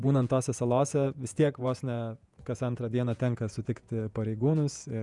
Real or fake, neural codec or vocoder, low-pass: fake; vocoder, 48 kHz, 128 mel bands, Vocos; 10.8 kHz